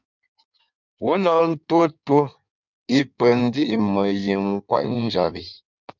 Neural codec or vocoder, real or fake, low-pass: codec, 16 kHz in and 24 kHz out, 1.1 kbps, FireRedTTS-2 codec; fake; 7.2 kHz